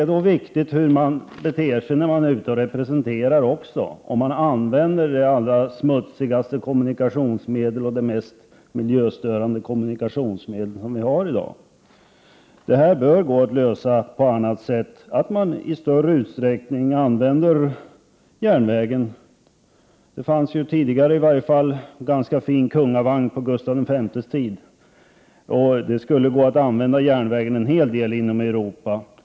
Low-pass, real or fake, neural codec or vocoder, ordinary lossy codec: none; real; none; none